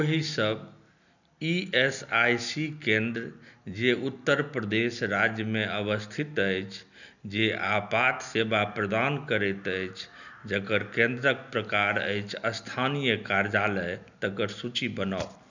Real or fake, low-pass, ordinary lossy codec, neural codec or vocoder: real; 7.2 kHz; none; none